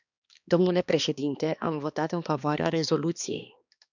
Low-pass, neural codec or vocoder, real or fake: 7.2 kHz; codec, 16 kHz, 2 kbps, X-Codec, HuBERT features, trained on balanced general audio; fake